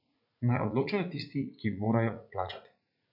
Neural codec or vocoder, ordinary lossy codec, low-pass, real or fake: vocoder, 44.1 kHz, 80 mel bands, Vocos; none; 5.4 kHz; fake